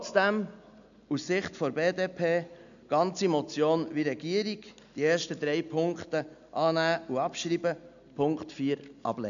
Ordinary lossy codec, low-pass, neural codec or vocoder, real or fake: none; 7.2 kHz; none; real